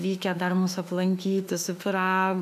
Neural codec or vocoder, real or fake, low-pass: autoencoder, 48 kHz, 32 numbers a frame, DAC-VAE, trained on Japanese speech; fake; 14.4 kHz